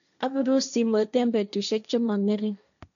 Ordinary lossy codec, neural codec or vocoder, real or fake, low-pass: none; codec, 16 kHz, 1.1 kbps, Voila-Tokenizer; fake; 7.2 kHz